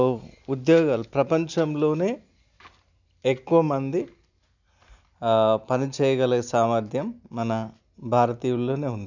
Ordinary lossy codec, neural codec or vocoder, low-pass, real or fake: none; none; 7.2 kHz; real